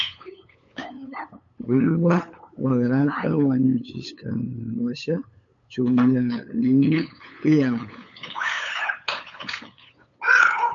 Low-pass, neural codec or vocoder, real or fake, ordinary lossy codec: 7.2 kHz; codec, 16 kHz, 8 kbps, FunCodec, trained on LibriTTS, 25 frames a second; fake; MP3, 64 kbps